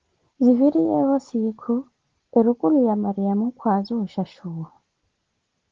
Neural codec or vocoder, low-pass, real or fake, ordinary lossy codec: none; 7.2 kHz; real; Opus, 16 kbps